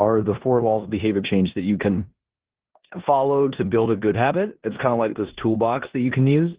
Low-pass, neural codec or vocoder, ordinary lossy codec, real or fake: 3.6 kHz; codec, 16 kHz in and 24 kHz out, 0.9 kbps, LongCat-Audio-Codec, four codebook decoder; Opus, 16 kbps; fake